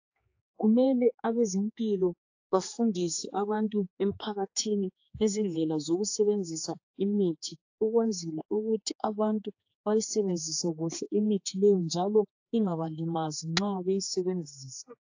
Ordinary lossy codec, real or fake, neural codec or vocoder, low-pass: AAC, 48 kbps; fake; codec, 16 kHz, 4 kbps, X-Codec, HuBERT features, trained on general audio; 7.2 kHz